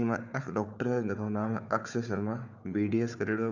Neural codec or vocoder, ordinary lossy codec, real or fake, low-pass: codec, 16 kHz, 4 kbps, FunCodec, trained on Chinese and English, 50 frames a second; none; fake; 7.2 kHz